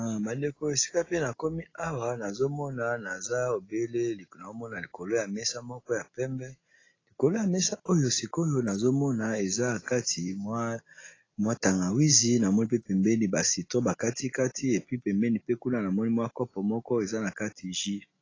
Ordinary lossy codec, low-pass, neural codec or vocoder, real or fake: AAC, 32 kbps; 7.2 kHz; none; real